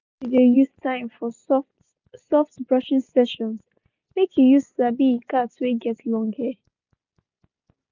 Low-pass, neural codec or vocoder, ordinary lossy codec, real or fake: 7.2 kHz; none; none; real